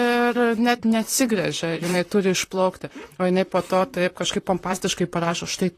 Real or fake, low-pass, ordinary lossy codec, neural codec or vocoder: fake; 14.4 kHz; AAC, 48 kbps; vocoder, 44.1 kHz, 128 mel bands, Pupu-Vocoder